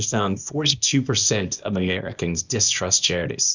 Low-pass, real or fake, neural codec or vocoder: 7.2 kHz; fake; codec, 24 kHz, 0.9 kbps, WavTokenizer, small release